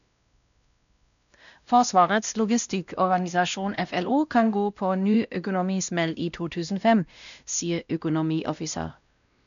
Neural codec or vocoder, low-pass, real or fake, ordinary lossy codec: codec, 16 kHz, 1 kbps, X-Codec, WavLM features, trained on Multilingual LibriSpeech; 7.2 kHz; fake; none